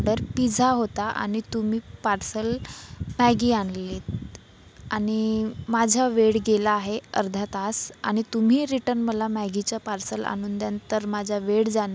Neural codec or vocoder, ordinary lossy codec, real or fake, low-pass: none; none; real; none